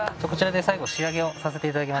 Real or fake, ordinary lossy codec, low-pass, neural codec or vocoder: real; none; none; none